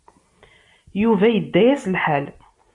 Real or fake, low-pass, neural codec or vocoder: real; 10.8 kHz; none